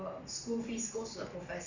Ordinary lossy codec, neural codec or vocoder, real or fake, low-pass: none; none; real; 7.2 kHz